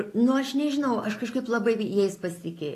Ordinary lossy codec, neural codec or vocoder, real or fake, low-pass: AAC, 48 kbps; none; real; 14.4 kHz